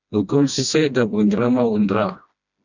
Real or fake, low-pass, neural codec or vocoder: fake; 7.2 kHz; codec, 16 kHz, 1 kbps, FreqCodec, smaller model